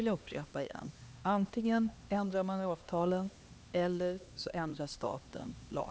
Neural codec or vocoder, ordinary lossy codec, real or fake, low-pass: codec, 16 kHz, 2 kbps, X-Codec, HuBERT features, trained on LibriSpeech; none; fake; none